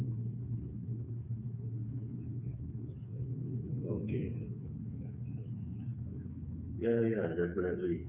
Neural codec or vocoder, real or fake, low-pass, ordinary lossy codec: codec, 16 kHz, 4 kbps, FreqCodec, smaller model; fake; 3.6 kHz; none